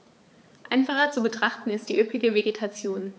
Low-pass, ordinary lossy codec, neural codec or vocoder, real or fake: none; none; codec, 16 kHz, 4 kbps, X-Codec, HuBERT features, trained on balanced general audio; fake